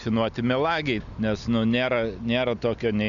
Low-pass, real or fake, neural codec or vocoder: 7.2 kHz; real; none